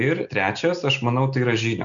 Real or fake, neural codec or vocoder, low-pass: real; none; 7.2 kHz